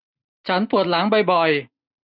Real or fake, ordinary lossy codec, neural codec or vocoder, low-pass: real; none; none; 5.4 kHz